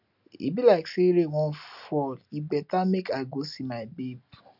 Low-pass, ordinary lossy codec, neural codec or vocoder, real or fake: 5.4 kHz; none; none; real